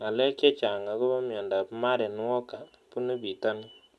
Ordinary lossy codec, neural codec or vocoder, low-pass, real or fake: none; none; none; real